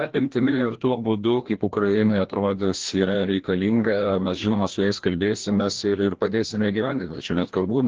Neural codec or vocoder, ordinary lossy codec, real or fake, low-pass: codec, 16 kHz, 1 kbps, FreqCodec, larger model; Opus, 16 kbps; fake; 7.2 kHz